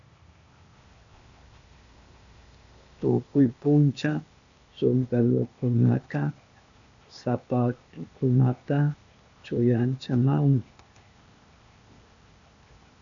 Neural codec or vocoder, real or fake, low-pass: codec, 16 kHz, 0.8 kbps, ZipCodec; fake; 7.2 kHz